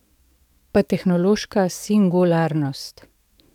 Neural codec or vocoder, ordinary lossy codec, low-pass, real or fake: codec, 44.1 kHz, 7.8 kbps, DAC; none; 19.8 kHz; fake